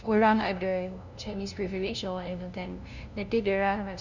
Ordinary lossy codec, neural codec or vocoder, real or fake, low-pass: none; codec, 16 kHz, 0.5 kbps, FunCodec, trained on LibriTTS, 25 frames a second; fake; 7.2 kHz